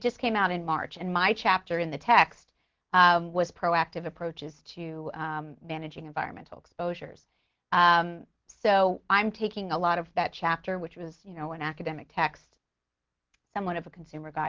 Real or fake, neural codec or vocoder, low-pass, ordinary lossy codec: real; none; 7.2 kHz; Opus, 16 kbps